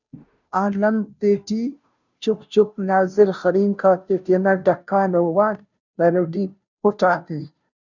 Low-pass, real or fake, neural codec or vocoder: 7.2 kHz; fake; codec, 16 kHz, 0.5 kbps, FunCodec, trained on Chinese and English, 25 frames a second